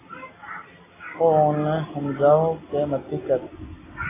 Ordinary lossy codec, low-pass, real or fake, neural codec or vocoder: AAC, 16 kbps; 3.6 kHz; real; none